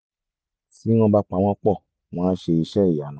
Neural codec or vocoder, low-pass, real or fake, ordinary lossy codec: none; none; real; none